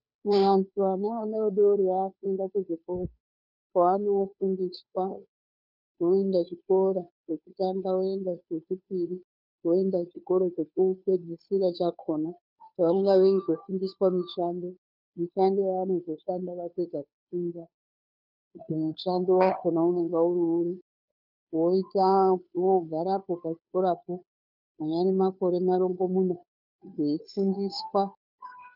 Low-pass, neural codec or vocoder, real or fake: 5.4 kHz; codec, 16 kHz, 2 kbps, FunCodec, trained on Chinese and English, 25 frames a second; fake